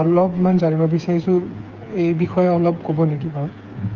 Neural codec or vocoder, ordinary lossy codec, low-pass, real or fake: codec, 16 kHz, 8 kbps, FreqCodec, smaller model; Opus, 24 kbps; 7.2 kHz; fake